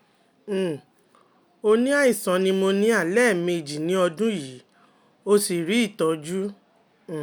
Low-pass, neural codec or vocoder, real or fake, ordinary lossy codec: none; none; real; none